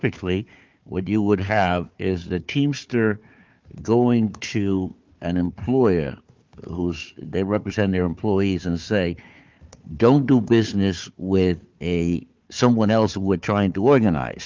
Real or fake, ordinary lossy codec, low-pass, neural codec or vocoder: fake; Opus, 24 kbps; 7.2 kHz; codec, 16 kHz, 4 kbps, FunCodec, trained on Chinese and English, 50 frames a second